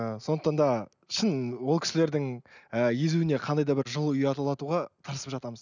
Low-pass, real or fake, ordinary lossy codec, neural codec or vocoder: 7.2 kHz; real; none; none